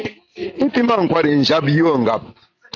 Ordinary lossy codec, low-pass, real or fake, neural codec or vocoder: AAC, 48 kbps; 7.2 kHz; fake; vocoder, 44.1 kHz, 128 mel bands every 512 samples, BigVGAN v2